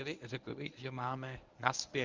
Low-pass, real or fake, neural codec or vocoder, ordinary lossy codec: 7.2 kHz; fake; codec, 24 kHz, 0.9 kbps, WavTokenizer, medium speech release version 1; Opus, 24 kbps